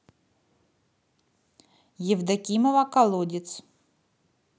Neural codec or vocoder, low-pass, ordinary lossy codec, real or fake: none; none; none; real